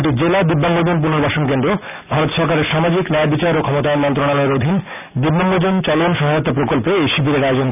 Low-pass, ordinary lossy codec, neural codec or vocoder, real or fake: 3.6 kHz; none; none; real